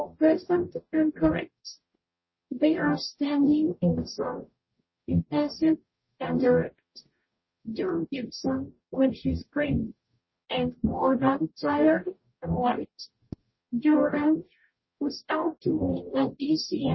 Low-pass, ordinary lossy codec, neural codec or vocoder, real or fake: 7.2 kHz; MP3, 24 kbps; codec, 44.1 kHz, 0.9 kbps, DAC; fake